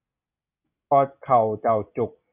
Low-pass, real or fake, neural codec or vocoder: 3.6 kHz; real; none